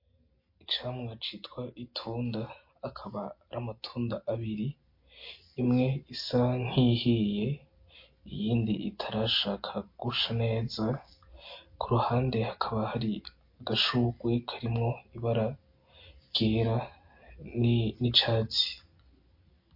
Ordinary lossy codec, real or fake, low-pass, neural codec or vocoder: MP3, 32 kbps; real; 5.4 kHz; none